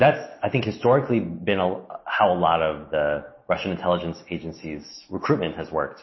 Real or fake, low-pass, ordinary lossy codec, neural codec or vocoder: real; 7.2 kHz; MP3, 24 kbps; none